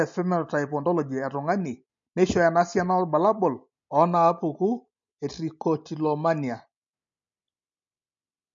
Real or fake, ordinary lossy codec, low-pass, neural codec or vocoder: real; MP3, 48 kbps; 7.2 kHz; none